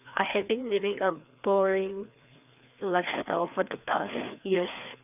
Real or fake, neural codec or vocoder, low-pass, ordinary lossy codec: fake; codec, 16 kHz, 2 kbps, FreqCodec, larger model; 3.6 kHz; none